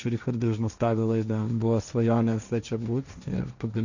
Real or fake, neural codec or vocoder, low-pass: fake; codec, 16 kHz, 1.1 kbps, Voila-Tokenizer; 7.2 kHz